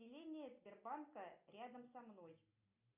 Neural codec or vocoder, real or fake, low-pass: none; real; 3.6 kHz